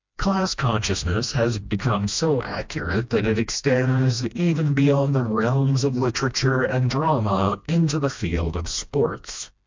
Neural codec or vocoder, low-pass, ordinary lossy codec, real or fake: codec, 16 kHz, 1 kbps, FreqCodec, smaller model; 7.2 kHz; MP3, 64 kbps; fake